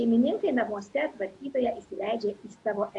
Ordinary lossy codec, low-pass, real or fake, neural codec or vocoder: AAC, 48 kbps; 10.8 kHz; real; none